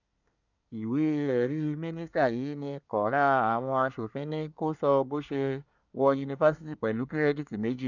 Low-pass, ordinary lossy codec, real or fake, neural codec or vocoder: 7.2 kHz; none; fake; codec, 24 kHz, 1 kbps, SNAC